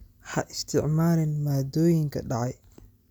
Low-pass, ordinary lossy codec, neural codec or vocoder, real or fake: none; none; none; real